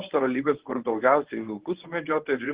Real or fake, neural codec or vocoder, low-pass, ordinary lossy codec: fake; codec, 24 kHz, 0.9 kbps, WavTokenizer, medium speech release version 1; 3.6 kHz; Opus, 16 kbps